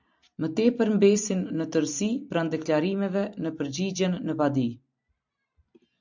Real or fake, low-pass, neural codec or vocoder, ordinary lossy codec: fake; 7.2 kHz; vocoder, 44.1 kHz, 128 mel bands every 256 samples, BigVGAN v2; MP3, 64 kbps